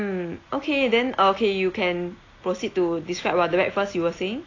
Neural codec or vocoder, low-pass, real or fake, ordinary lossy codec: none; 7.2 kHz; real; AAC, 32 kbps